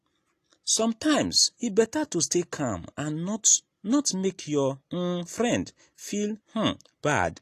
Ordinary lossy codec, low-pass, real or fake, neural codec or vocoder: AAC, 48 kbps; 14.4 kHz; real; none